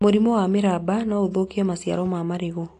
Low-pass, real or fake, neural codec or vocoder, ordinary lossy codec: 10.8 kHz; real; none; AAC, 48 kbps